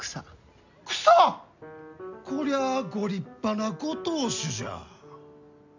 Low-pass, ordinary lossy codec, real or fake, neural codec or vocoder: 7.2 kHz; none; real; none